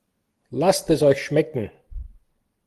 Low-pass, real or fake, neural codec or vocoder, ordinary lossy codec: 14.4 kHz; real; none; Opus, 24 kbps